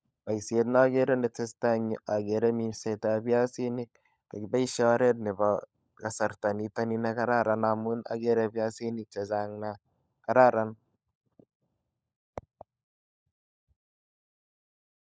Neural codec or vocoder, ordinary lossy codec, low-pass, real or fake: codec, 16 kHz, 16 kbps, FunCodec, trained on LibriTTS, 50 frames a second; none; none; fake